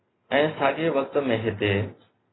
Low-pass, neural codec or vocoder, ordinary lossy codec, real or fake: 7.2 kHz; none; AAC, 16 kbps; real